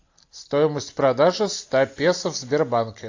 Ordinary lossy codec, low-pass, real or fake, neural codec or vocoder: AAC, 48 kbps; 7.2 kHz; real; none